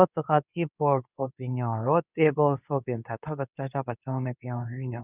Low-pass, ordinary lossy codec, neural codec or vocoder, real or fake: 3.6 kHz; none; codec, 24 kHz, 0.9 kbps, WavTokenizer, medium speech release version 2; fake